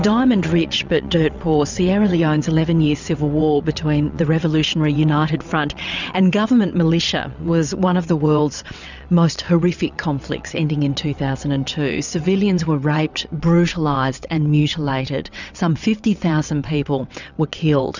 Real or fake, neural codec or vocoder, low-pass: fake; vocoder, 22.05 kHz, 80 mel bands, WaveNeXt; 7.2 kHz